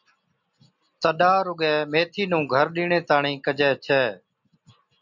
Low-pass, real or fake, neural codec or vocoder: 7.2 kHz; real; none